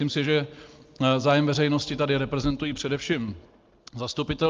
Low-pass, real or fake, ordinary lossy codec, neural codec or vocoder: 7.2 kHz; real; Opus, 32 kbps; none